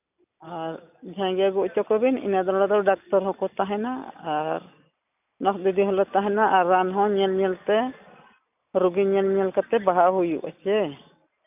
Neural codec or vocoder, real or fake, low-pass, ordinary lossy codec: none; real; 3.6 kHz; none